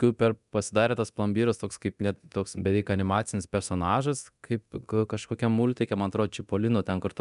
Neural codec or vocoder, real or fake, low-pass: codec, 24 kHz, 0.9 kbps, DualCodec; fake; 10.8 kHz